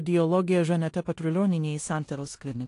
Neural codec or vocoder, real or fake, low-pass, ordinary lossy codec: codec, 16 kHz in and 24 kHz out, 0.9 kbps, LongCat-Audio-Codec, four codebook decoder; fake; 10.8 kHz; AAC, 48 kbps